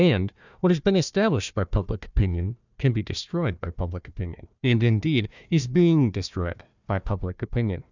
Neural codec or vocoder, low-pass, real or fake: codec, 16 kHz, 1 kbps, FunCodec, trained on Chinese and English, 50 frames a second; 7.2 kHz; fake